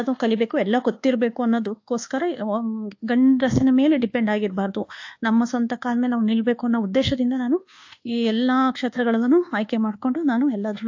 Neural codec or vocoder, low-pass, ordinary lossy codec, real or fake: codec, 24 kHz, 1.2 kbps, DualCodec; 7.2 kHz; AAC, 48 kbps; fake